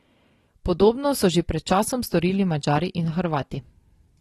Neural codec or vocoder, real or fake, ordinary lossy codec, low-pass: none; real; AAC, 32 kbps; 14.4 kHz